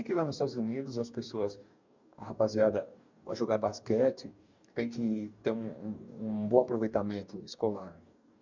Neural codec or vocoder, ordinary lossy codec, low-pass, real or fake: codec, 44.1 kHz, 2.6 kbps, DAC; MP3, 64 kbps; 7.2 kHz; fake